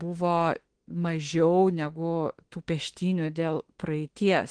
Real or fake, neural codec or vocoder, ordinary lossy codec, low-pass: fake; autoencoder, 48 kHz, 32 numbers a frame, DAC-VAE, trained on Japanese speech; Opus, 16 kbps; 9.9 kHz